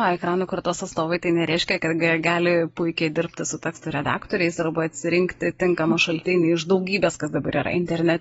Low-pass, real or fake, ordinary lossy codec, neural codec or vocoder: 19.8 kHz; real; AAC, 24 kbps; none